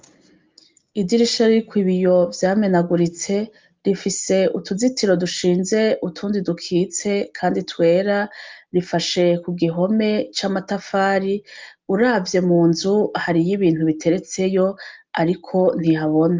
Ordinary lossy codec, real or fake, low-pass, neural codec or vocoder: Opus, 32 kbps; real; 7.2 kHz; none